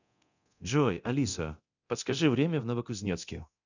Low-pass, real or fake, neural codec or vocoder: 7.2 kHz; fake; codec, 24 kHz, 0.9 kbps, DualCodec